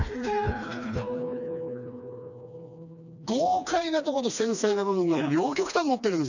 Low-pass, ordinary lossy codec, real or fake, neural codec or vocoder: 7.2 kHz; none; fake; codec, 16 kHz, 2 kbps, FreqCodec, smaller model